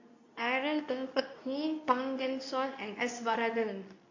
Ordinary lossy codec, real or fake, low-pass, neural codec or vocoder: AAC, 48 kbps; fake; 7.2 kHz; codec, 24 kHz, 0.9 kbps, WavTokenizer, medium speech release version 2